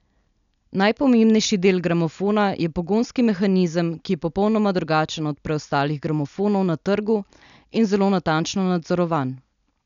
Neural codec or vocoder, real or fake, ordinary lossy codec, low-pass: none; real; none; 7.2 kHz